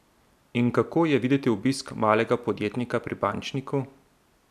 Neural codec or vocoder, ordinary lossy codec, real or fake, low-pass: none; none; real; 14.4 kHz